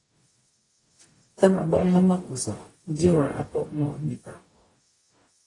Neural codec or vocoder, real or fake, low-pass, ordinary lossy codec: codec, 44.1 kHz, 0.9 kbps, DAC; fake; 10.8 kHz; AAC, 32 kbps